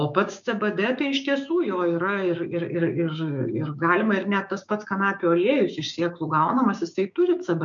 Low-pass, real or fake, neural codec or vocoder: 7.2 kHz; fake; codec, 16 kHz, 6 kbps, DAC